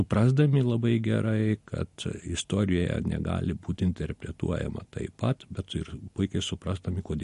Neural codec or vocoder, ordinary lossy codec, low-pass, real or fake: none; MP3, 48 kbps; 14.4 kHz; real